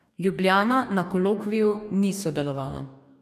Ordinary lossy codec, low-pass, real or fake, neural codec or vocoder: none; 14.4 kHz; fake; codec, 44.1 kHz, 2.6 kbps, DAC